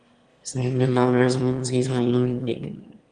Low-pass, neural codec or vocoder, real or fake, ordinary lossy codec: 9.9 kHz; autoencoder, 22.05 kHz, a latent of 192 numbers a frame, VITS, trained on one speaker; fake; Opus, 32 kbps